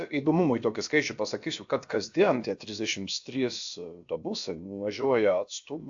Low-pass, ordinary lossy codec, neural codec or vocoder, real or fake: 7.2 kHz; AAC, 64 kbps; codec, 16 kHz, about 1 kbps, DyCAST, with the encoder's durations; fake